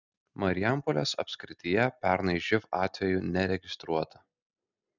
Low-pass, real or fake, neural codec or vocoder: 7.2 kHz; real; none